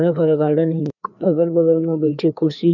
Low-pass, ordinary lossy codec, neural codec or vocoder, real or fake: 7.2 kHz; none; codec, 16 kHz, 2 kbps, FreqCodec, larger model; fake